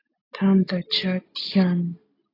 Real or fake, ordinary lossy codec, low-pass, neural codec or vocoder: real; AAC, 24 kbps; 5.4 kHz; none